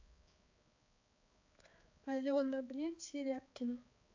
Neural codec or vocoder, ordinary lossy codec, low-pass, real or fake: codec, 16 kHz, 4 kbps, X-Codec, HuBERT features, trained on balanced general audio; AAC, 48 kbps; 7.2 kHz; fake